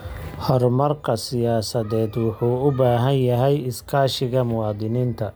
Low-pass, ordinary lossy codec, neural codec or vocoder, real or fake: none; none; none; real